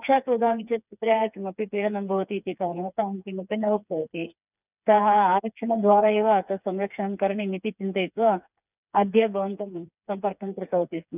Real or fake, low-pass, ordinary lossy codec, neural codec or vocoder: fake; 3.6 kHz; none; codec, 16 kHz, 4 kbps, FreqCodec, smaller model